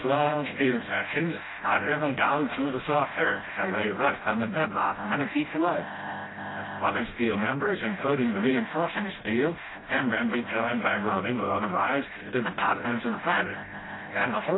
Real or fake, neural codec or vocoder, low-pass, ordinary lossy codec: fake; codec, 16 kHz, 0.5 kbps, FreqCodec, smaller model; 7.2 kHz; AAC, 16 kbps